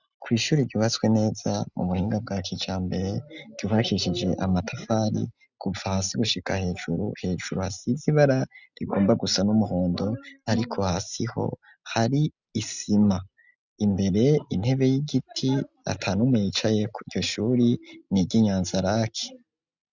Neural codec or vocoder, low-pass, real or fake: none; 7.2 kHz; real